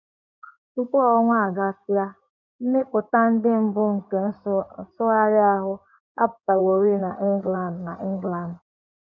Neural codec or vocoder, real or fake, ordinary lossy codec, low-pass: codec, 16 kHz in and 24 kHz out, 2.2 kbps, FireRedTTS-2 codec; fake; none; 7.2 kHz